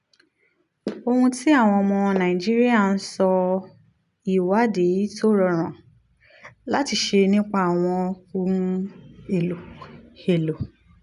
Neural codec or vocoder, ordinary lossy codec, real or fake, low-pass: none; none; real; 10.8 kHz